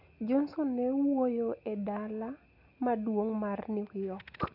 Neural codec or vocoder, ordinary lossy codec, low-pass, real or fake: none; none; 5.4 kHz; real